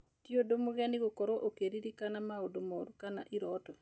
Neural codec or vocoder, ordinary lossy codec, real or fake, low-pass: none; none; real; none